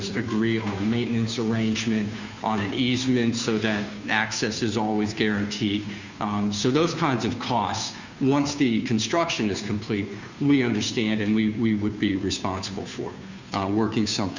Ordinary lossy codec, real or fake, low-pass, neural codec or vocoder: Opus, 64 kbps; fake; 7.2 kHz; codec, 16 kHz, 2 kbps, FunCodec, trained on Chinese and English, 25 frames a second